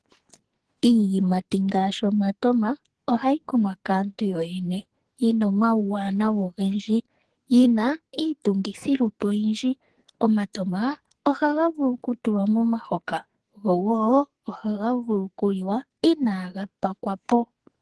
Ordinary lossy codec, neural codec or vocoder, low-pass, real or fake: Opus, 16 kbps; codec, 44.1 kHz, 2.6 kbps, SNAC; 10.8 kHz; fake